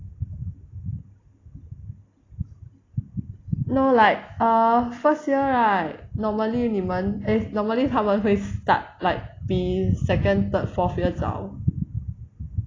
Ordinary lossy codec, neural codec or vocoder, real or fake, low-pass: AAC, 32 kbps; none; real; 7.2 kHz